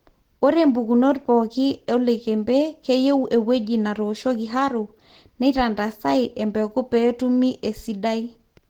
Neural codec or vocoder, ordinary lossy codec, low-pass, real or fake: none; Opus, 16 kbps; 19.8 kHz; real